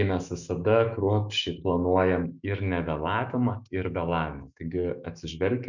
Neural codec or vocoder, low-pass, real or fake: autoencoder, 48 kHz, 128 numbers a frame, DAC-VAE, trained on Japanese speech; 7.2 kHz; fake